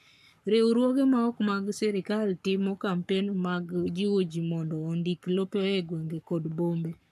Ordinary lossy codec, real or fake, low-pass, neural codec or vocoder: MP3, 96 kbps; fake; 14.4 kHz; codec, 44.1 kHz, 7.8 kbps, Pupu-Codec